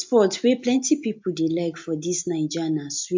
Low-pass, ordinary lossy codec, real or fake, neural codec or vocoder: 7.2 kHz; MP3, 48 kbps; real; none